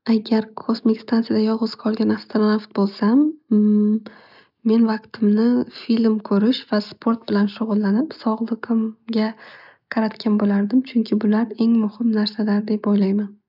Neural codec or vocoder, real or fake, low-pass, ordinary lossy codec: none; real; 5.4 kHz; none